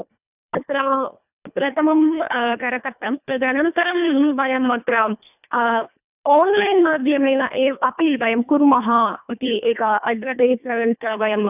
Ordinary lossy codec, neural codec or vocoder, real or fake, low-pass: none; codec, 24 kHz, 1.5 kbps, HILCodec; fake; 3.6 kHz